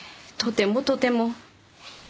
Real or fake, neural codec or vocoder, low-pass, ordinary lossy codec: real; none; none; none